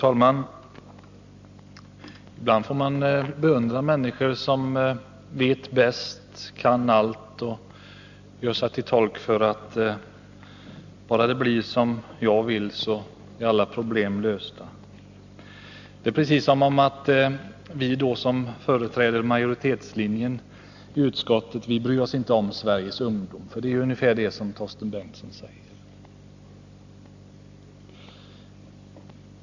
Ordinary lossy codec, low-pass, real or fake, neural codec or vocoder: none; 7.2 kHz; real; none